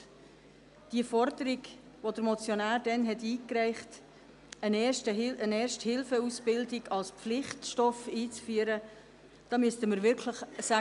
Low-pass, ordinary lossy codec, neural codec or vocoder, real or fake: 10.8 kHz; none; none; real